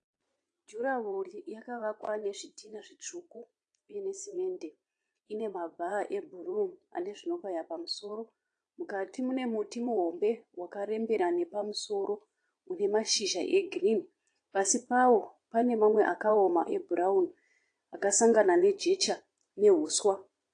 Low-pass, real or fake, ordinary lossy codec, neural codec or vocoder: 9.9 kHz; fake; AAC, 48 kbps; vocoder, 22.05 kHz, 80 mel bands, Vocos